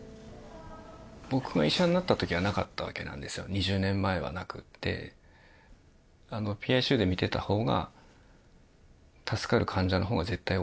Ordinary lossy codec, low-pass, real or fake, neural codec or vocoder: none; none; real; none